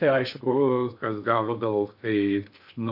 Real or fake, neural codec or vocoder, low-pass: fake; codec, 16 kHz in and 24 kHz out, 0.6 kbps, FocalCodec, streaming, 2048 codes; 5.4 kHz